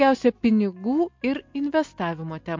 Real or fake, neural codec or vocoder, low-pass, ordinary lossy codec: real; none; 7.2 kHz; MP3, 48 kbps